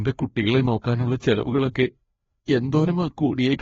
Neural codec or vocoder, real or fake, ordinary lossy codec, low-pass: codec, 16 kHz, 2 kbps, FreqCodec, larger model; fake; AAC, 24 kbps; 7.2 kHz